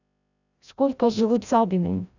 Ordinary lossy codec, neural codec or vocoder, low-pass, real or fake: none; codec, 16 kHz, 0.5 kbps, FreqCodec, larger model; 7.2 kHz; fake